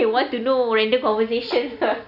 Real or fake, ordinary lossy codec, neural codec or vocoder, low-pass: real; none; none; 5.4 kHz